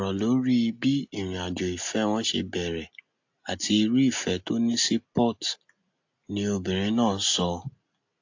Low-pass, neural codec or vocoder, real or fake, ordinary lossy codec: 7.2 kHz; none; real; AAC, 48 kbps